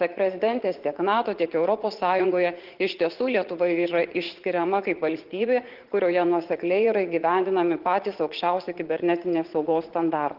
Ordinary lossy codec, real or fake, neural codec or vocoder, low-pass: Opus, 16 kbps; fake; vocoder, 44.1 kHz, 80 mel bands, Vocos; 5.4 kHz